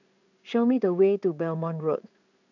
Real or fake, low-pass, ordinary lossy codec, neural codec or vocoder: fake; 7.2 kHz; none; codec, 16 kHz in and 24 kHz out, 1 kbps, XY-Tokenizer